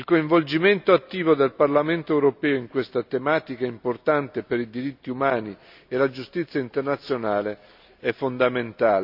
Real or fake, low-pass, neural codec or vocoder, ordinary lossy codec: real; 5.4 kHz; none; AAC, 48 kbps